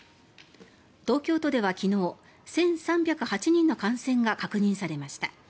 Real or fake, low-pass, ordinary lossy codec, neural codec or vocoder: real; none; none; none